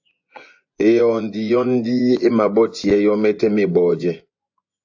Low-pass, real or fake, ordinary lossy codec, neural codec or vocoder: 7.2 kHz; fake; AAC, 48 kbps; vocoder, 24 kHz, 100 mel bands, Vocos